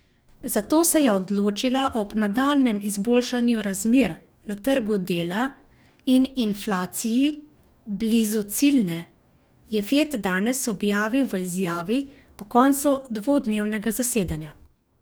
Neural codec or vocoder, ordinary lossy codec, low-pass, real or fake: codec, 44.1 kHz, 2.6 kbps, DAC; none; none; fake